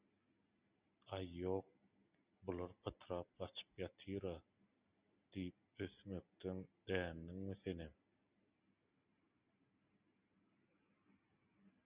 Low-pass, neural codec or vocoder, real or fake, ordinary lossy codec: 3.6 kHz; none; real; Opus, 64 kbps